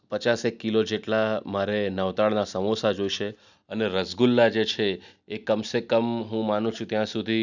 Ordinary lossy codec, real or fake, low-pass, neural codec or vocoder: none; real; 7.2 kHz; none